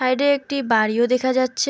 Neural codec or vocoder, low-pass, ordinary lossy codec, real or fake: none; none; none; real